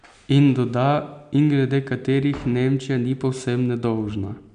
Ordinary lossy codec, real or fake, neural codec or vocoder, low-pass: none; real; none; 9.9 kHz